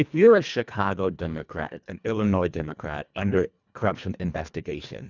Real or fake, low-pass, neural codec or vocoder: fake; 7.2 kHz; codec, 24 kHz, 1.5 kbps, HILCodec